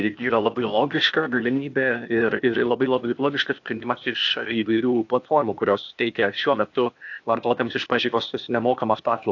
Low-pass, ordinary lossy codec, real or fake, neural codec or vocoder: 7.2 kHz; AAC, 48 kbps; fake; codec, 16 kHz, 0.8 kbps, ZipCodec